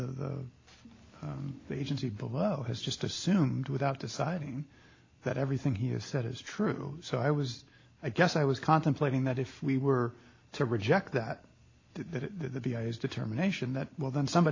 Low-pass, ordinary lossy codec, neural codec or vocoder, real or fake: 7.2 kHz; AAC, 32 kbps; none; real